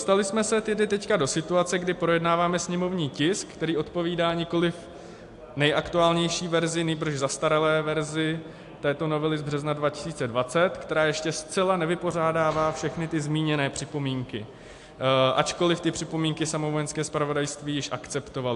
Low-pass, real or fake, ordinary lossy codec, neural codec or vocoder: 10.8 kHz; real; AAC, 64 kbps; none